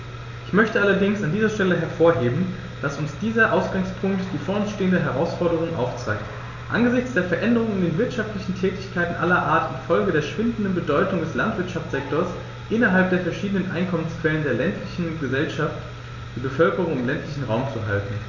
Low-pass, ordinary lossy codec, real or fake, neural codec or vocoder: 7.2 kHz; none; real; none